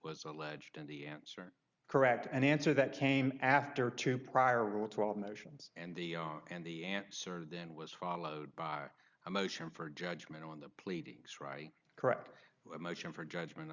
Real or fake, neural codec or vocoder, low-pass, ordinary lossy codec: real; none; 7.2 kHz; Opus, 64 kbps